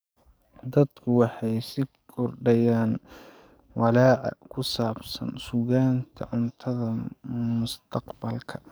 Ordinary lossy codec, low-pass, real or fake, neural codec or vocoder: none; none; fake; codec, 44.1 kHz, 7.8 kbps, DAC